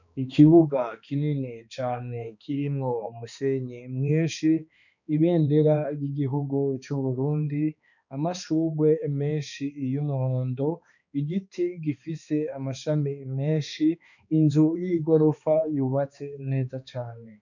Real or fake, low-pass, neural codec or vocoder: fake; 7.2 kHz; codec, 16 kHz, 2 kbps, X-Codec, HuBERT features, trained on balanced general audio